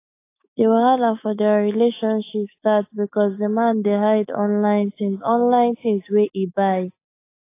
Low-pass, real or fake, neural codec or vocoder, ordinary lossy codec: 3.6 kHz; fake; autoencoder, 48 kHz, 128 numbers a frame, DAC-VAE, trained on Japanese speech; AAC, 24 kbps